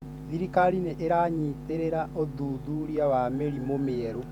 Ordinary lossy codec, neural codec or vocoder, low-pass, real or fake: MP3, 96 kbps; vocoder, 48 kHz, 128 mel bands, Vocos; 19.8 kHz; fake